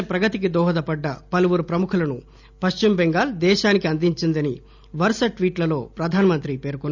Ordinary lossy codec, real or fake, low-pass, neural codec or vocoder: none; real; 7.2 kHz; none